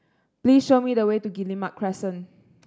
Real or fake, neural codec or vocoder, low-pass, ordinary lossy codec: real; none; none; none